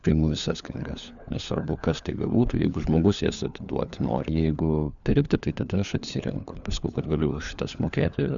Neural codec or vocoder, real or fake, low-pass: codec, 16 kHz, 4 kbps, FunCodec, trained on LibriTTS, 50 frames a second; fake; 7.2 kHz